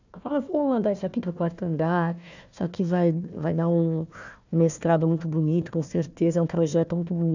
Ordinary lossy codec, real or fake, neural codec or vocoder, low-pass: none; fake; codec, 16 kHz, 1 kbps, FunCodec, trained on Chinese and English, 50 frames a second; 7.2 kHz